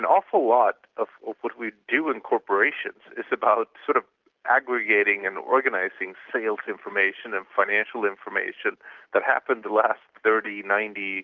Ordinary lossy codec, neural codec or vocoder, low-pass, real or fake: Opus, 24 kbps; none; 7.2 kHz; real